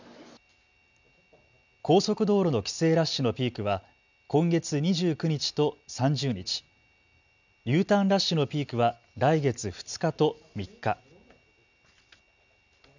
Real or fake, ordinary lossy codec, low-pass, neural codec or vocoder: real; none; 7.2 kHz; none